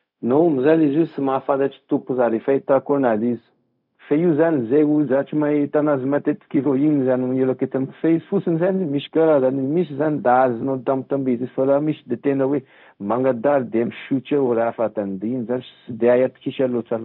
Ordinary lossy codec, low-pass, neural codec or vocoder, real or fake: none; 5.4 kHz; codec, 16 kHz, 0.4 kbps, LongCat-Audio-Codec; fake